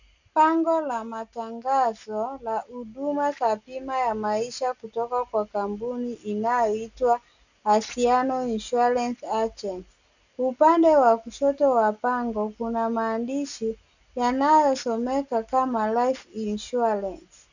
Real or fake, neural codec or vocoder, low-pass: real; none; 7.2 kHz